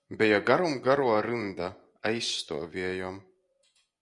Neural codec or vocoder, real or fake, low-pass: vocoder, 24 kHz, 100 mel bands, Vocos; fake; 10.8 kHz